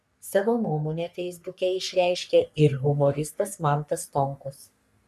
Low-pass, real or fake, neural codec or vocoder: 14.4 kHz; fake; codec, 44.1 kHz, 3.4 kbps, Pupu-Codec